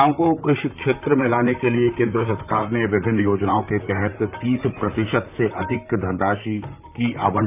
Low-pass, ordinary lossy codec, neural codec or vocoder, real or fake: 3.6 kHz; none; vocoder, 44.1 kHz, 128 mel bands, Pupu-Vocoder; fake